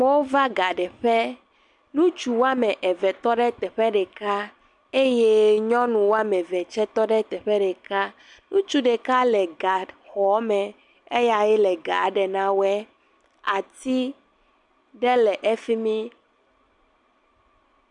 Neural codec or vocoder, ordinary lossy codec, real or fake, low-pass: none; MP3, 96 kbps; real; 10.8 kHz